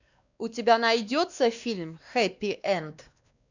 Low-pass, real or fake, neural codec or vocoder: 7.2 kHz; fake; codec, 16 kHz, 2 kbps, X-Codec, WavLM features, trained on Multilingual LibriSpeech